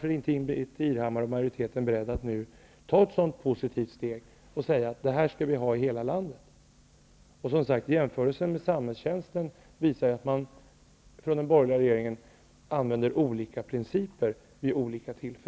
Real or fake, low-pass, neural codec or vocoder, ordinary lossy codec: real; none; none; none